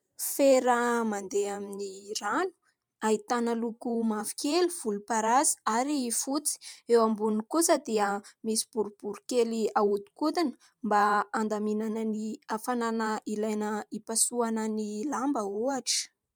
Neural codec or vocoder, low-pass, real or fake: vocoder, 44.1 kHz, 128 mel bands every 512 samples, BigVGAN v2; 19.8 kHz; fake